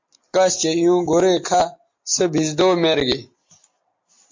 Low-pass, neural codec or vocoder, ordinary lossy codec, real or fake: 7.2 kHz; none; MP3, 48 kbps; real